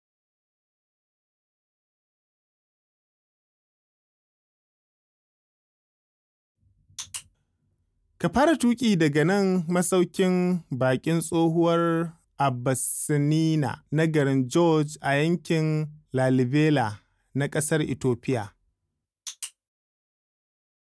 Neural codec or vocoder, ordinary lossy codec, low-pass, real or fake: none; none; none; real